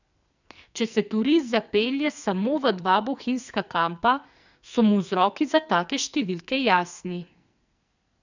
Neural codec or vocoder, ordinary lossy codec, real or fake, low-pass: codec, 44.1 kHz, 2.6 kbps, SNAC; none; fake; 7.2 kHz